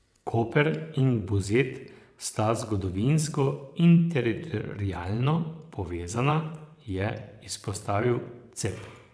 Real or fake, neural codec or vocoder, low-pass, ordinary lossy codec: fake; vocoder, 22.05 kHz, 80 mel bands, WaveNeXt; none; none